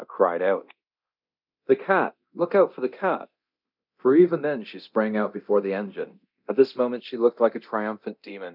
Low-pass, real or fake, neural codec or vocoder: 5.4 kHz; fake; codec, 24 kHz, 0.9 kbps, DualCodec